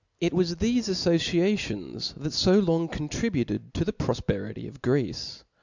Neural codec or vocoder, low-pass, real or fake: none; 7.2 kHz; real